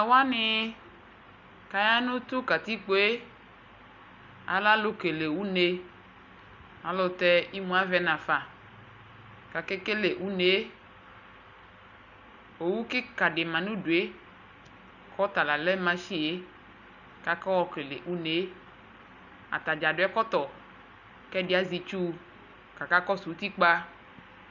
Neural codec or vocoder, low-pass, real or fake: none; 7.2 kHz; real